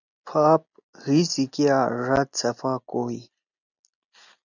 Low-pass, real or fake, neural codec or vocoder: 7.2 kHz; real; none